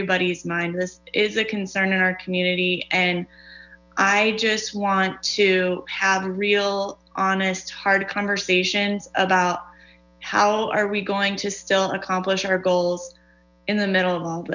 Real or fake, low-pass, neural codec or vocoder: real; 7.2 kHz; none